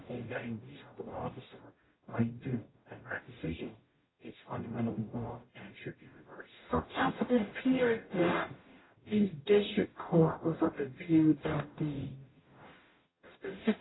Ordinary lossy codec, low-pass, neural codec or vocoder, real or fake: AAC, 16 kbps; 7.2 kHz; codec, 44.1 kHz, 0.9 kbps, DAC; fake